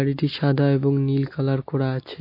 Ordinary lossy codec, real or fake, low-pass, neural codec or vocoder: MP3, 32 kbps; real; 5.4 kHz; none